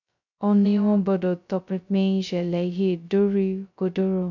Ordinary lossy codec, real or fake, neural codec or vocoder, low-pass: none; fake; codec, 16 kHz, 0.2 kbps, FocalCodec; 7.2 kHz